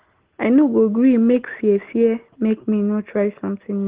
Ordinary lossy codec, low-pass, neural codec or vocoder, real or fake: Opus, 16 kbps; 3.6 kHz; none; real